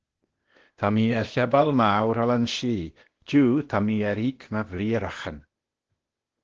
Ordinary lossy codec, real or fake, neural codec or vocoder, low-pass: Opus, 16 kbps; fake; codec, 16 kHz, 0.8 kbps, ZipCodec; 7.2 kHz